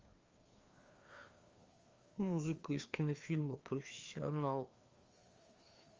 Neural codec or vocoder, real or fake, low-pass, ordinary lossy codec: codec, 16 kHz, 2 kbps, FreqCodec, larger model; fake; 7.2 kHz; Opus, 32 kbps